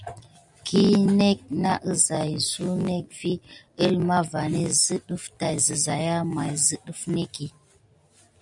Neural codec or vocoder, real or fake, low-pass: none; real; 10.8 kHz